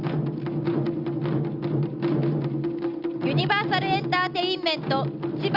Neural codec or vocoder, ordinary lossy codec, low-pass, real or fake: none; Opus, 64 kbps; 5.4 kHz; real